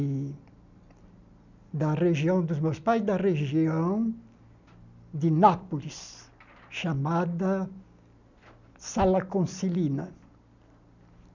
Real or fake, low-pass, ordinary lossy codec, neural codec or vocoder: real; 7.2 kHz; none; none